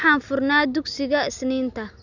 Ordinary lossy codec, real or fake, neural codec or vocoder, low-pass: none; real; none; 7.2 kHz